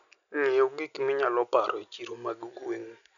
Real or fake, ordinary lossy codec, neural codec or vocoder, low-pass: real; none; none; 7.2 kHz